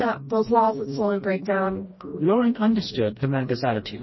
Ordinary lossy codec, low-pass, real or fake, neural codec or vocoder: MP3, 24 kbps; 7.2 kHz; fake; codec, 16 kHz, 1 kbps, FreqCodec, smaller model